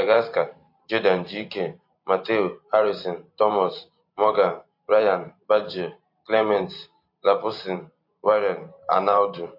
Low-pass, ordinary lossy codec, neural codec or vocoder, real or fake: 5.4 kHz; MP3, 32 kbps; vocoder, 44.1 kHz, 128 mel bands every 512 samples, BigVGAN v2; fake